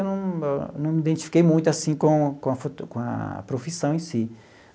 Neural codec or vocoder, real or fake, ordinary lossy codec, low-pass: none; real; none; none